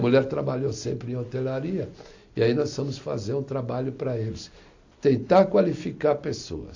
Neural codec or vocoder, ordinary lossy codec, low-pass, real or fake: none; AAC, 48 kbps; 7.2 kHz; real